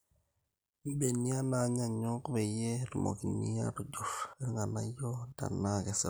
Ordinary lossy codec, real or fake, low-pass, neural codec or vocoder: none; real; none; none